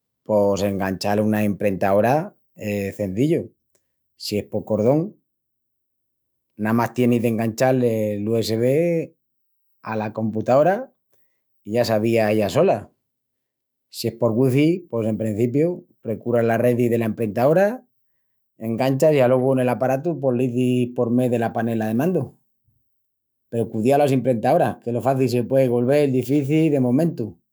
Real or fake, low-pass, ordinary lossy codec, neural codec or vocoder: fake; none; none; autoencoder, 48 kHz, 128 numbers a frame, DAC-VAE, trained on Japanese speech